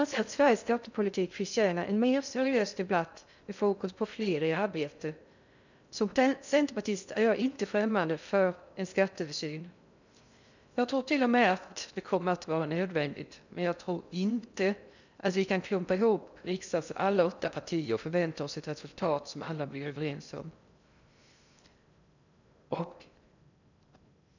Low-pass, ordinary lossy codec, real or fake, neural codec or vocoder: 7.2 kHz; none; fake; codec, 16 kHz in and 24 kHz out, 0.6 kbps, FocalCodec, streaming, 2048 codes